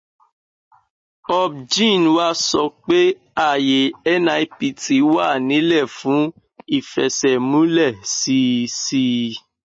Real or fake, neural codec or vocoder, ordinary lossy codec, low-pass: real; none; MP3, 32 kbps; 9.9 kHz